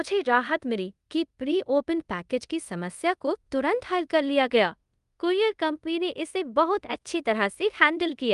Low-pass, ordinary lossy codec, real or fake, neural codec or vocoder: 10.8 kHz; Opus, 32 kbps; fake; codec, 24 kHz, 0.5 kbps, DualCodec